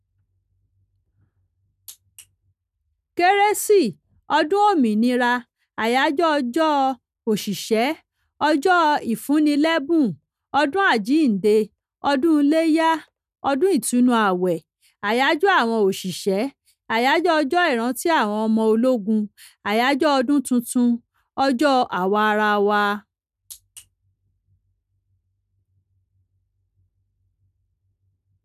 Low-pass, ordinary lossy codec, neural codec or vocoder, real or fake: 14.4 kHz; none; none; real